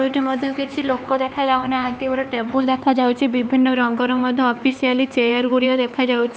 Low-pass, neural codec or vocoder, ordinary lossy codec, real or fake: none; codec, 16 kHz, 4 kbps, X-Codec, HuBERT features, trained on LibriSpeech; none; fake